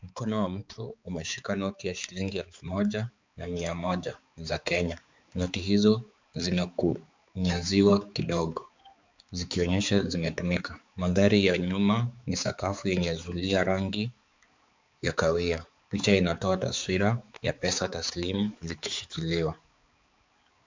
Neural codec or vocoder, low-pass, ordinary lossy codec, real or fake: codec, 16 kHz, 4 kbps, X-Codec, HuBERT features, trained on balanced general audio; 7.2 kHz; MP3, 64 kbps; fake